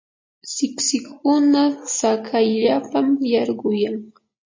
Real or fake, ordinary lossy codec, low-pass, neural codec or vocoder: real; MP3, 32 kbps; 7.2 kHz; none